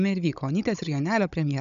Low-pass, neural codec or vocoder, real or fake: 7.2 kHz; codec, 16 kHz, 16 kbps, FunCodec, trained on Chinese and English, 50 frames a second; fake